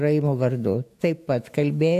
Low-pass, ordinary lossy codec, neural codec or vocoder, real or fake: 14.4 kHz; AAC, 64 kbps; autoencoder, 48 kHz, 128 numbers a frame, DAC-VAE, trained on Japanese speech; fake